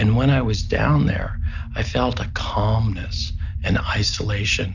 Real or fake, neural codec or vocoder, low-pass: real; none; 7.2 kHz